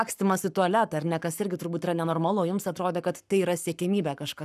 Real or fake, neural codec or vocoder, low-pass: fake; codec, 44.1 kHz, 7.8 kbps, Pupu-Codec; 14.4 kHz